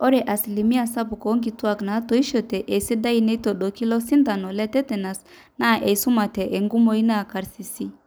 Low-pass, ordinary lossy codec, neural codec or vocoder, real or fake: none; none; none; real